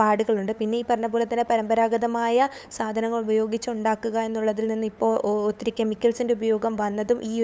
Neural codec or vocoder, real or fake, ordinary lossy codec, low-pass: codec, 16 kHz, 8 kbps, FunCodec, trained on LibriTTS, 25 frames a second; fake; none; none